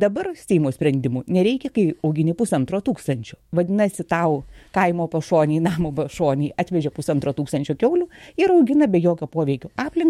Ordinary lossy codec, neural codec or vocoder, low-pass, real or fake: MP3, 64 kbps; autoencoder, 48 kHz, 128 numbers a frame, DAC-VAE, trained on Japanese speech; 19.8 kHz; fake